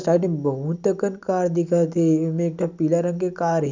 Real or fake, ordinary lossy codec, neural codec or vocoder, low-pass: real; none; none; 7.2 kHz